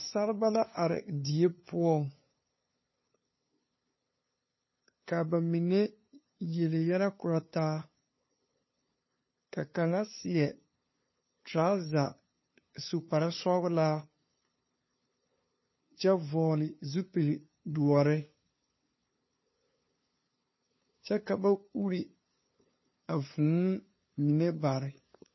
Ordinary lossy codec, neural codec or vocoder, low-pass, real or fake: MP3, 24 kbps; codec, 16 kHz, 2 kbps, FunCodec, trained on LibriTTS, 25 frames a second; 7.2 kHz; fake